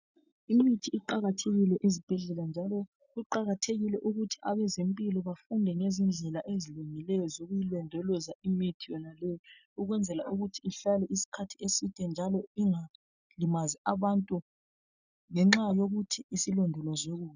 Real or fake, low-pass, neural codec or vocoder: real; 7.2 kHz; none